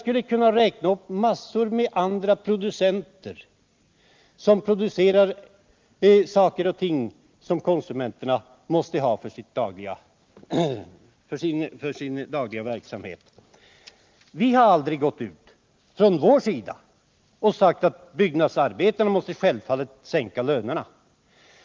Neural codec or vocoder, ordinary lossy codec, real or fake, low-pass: none; Opus, 24 kbps; real; 7.2 kHz